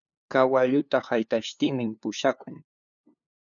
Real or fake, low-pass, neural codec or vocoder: fake; 7.2 kHz; codec, 16 kHz, 2 kbps, FunCodec, trained on LibriTTS, 25 frames a second